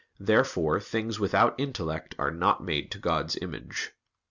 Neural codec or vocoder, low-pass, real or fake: none; 7.2 kHz; real